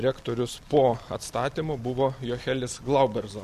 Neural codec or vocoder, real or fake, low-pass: none; real; 14.4 kHz